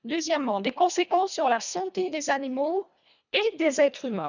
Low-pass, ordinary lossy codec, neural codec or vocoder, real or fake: 7.2 kHz; none; codec, 24 kHz, 1.5 kbps, HILCodec; fake